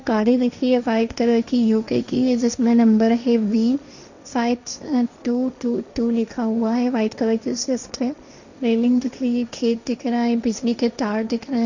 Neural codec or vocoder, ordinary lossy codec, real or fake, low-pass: codec, 16 kHz, 1.1 kbps, Voila-Tokenizer; none; fake; 7.2 kHz